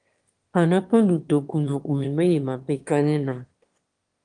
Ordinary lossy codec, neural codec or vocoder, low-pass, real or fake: Opus, 24 kbps; autoencoder, 22.05 kHz, a latent of 192 numbers a frame, VITS, trained on one speaker; 9.9 kHz; fake